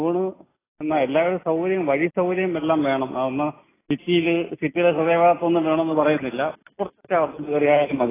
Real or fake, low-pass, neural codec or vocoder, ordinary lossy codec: real; 3.6 kHz; none; AAC, 16 kbps